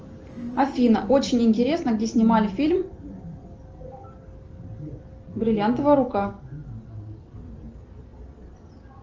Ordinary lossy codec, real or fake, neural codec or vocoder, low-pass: Opus, 24 kbps; real; none; 7.2 kHz